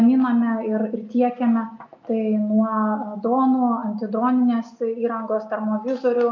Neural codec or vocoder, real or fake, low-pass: none; real; 7.2 kHz